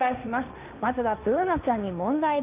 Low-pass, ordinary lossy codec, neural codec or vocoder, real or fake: 3.6 kHz; none; codec, 16 kHz, 1.1 kbps, Voila-Tokenizer; fake